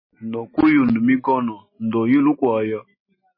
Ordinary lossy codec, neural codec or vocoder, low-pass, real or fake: MP3, 24 kbps; none; 5.4 kHz; real